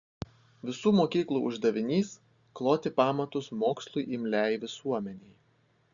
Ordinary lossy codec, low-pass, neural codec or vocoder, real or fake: Opus, 64 kbps; 7.2 kHz; none; real